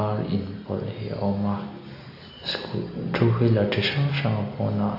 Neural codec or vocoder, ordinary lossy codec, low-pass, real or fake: none; AAC, 32 kbps; 5.4 kHz; real